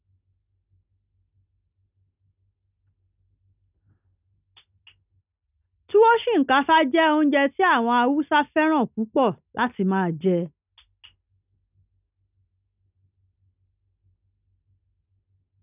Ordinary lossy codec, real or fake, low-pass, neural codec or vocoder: none; real; 3.6 kHz; none